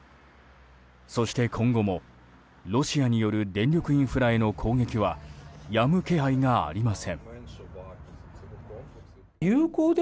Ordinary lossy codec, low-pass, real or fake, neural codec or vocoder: none; none; real; none